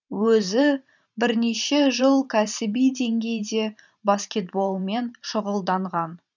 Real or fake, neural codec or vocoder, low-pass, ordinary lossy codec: fake; vocoder, 44.1 kHz, 128 mel bands every 512 samples, BigVGAN v2; 7.2 kHz; none